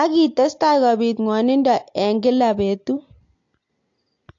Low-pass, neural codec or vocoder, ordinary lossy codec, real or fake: 7.2 kHz; none; AAC, 48 kbps; real